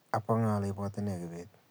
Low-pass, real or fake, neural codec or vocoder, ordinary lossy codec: none; real; none; none